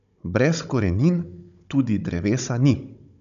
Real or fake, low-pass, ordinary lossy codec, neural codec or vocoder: fake; 7.2 kHz; none; codec, 16 kHz, 16 kbps, FunCodec, trained on Chinese and English, 50 frames a second